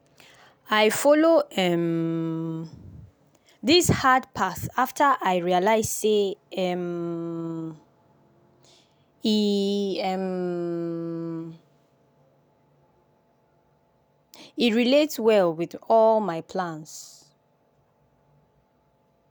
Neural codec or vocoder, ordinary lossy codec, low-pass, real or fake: none; none; none; real